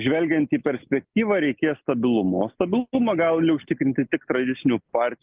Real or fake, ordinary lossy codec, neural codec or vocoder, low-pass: real; Opus, 32 kbps; none; 3.6 kHz